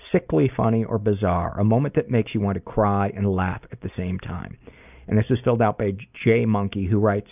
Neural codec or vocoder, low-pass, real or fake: none; 3.6 kHz; real